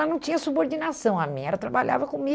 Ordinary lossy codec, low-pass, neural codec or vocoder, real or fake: none; none; none; real